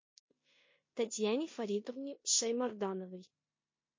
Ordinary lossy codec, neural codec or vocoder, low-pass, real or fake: MP3, 32 kbps; codec, 16 kHz in and 24 kHz out, 0.9 kbps, LongCat-Audio-Codec, four codebook decoder; 7.2 kHz; fake